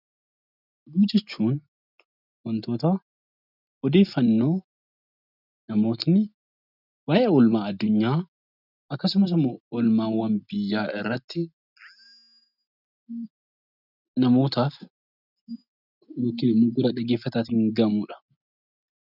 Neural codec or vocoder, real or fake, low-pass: none; real; 5.4 kHz